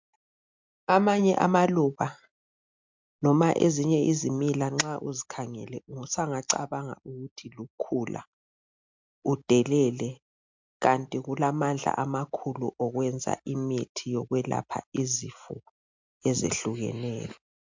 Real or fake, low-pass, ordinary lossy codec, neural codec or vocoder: real; 7.2 kHz; MP3, 64 kbps; none